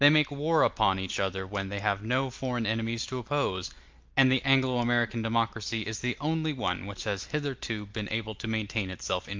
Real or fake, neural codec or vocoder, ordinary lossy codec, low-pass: real; none; Opus, 24 kbps; 7.2 kHz